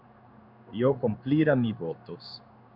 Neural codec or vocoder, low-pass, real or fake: codec, 16 kHz in and 24 kHz out, 1 kbps, XY-Tokenizer; 5.4 kHz; fake